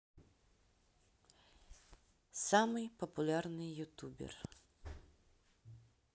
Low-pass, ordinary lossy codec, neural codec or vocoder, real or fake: none; none; none; real